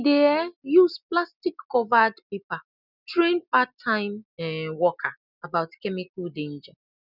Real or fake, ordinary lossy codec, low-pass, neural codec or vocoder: real; none; 5.4 kHz; none